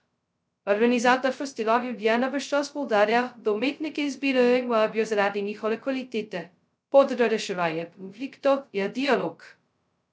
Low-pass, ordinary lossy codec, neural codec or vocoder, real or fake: none; none; codec, 16 kHz, 0.2 kbps, FocalCodec; fake